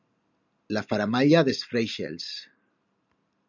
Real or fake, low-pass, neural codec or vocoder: real; 7.2 kHz; none